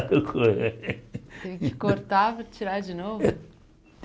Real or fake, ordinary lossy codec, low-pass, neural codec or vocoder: real; none; none; none